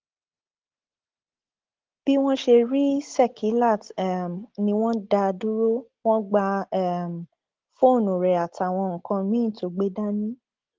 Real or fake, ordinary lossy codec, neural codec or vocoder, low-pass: real; Opus, 16 kbps; none; 7.2 kHz